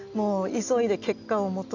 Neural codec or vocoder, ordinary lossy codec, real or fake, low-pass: none; none; real; 7.2 kHz